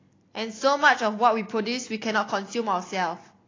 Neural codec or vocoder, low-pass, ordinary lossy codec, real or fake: none; 7.2 kHz; AAC, 32 kbps; real